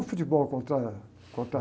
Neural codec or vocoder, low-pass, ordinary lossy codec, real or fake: none; none; none; real